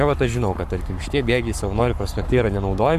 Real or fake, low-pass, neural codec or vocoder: fake; 14.4 kHz; codec, 44.1 kHz, 7.8 kbps, Pupu-Codec